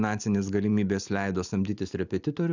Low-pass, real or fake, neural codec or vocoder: 7.2 kHz; real; none